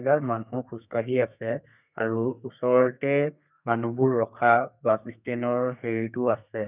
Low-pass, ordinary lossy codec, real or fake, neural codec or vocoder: 3.6 kHz; none; fake; codec, 32 kHz, 1.9 kbps, SNAC